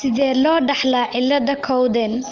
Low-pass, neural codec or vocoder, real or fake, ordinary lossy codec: 7.2 kHz; none; real; Opus, 24 kbps